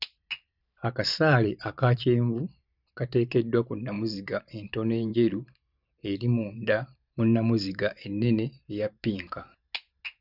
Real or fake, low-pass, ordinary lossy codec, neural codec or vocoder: fake; 5.4 kHz; none; vocoder, 44.1 kHz, 128 mel bands every 512 samples, BigVGAN v2